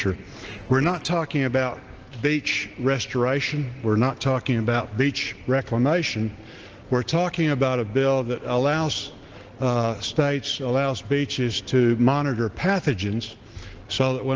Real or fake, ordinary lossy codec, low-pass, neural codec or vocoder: real; Opus, 16 kbps; 7.2 kHz; none